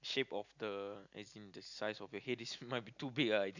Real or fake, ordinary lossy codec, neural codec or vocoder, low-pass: real; none; none; 7.2 kHz